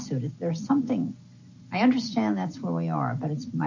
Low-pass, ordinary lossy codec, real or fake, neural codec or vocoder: 7.2 kHz; AAC, 48 kbps; real; none